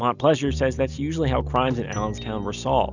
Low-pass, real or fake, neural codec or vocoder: 7.2 kHz; real; none